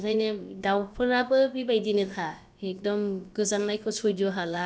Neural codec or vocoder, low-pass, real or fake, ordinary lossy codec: codec, 16 kHz, about 1 kbps, DyCAST, with the encoder's durations; none; fake; none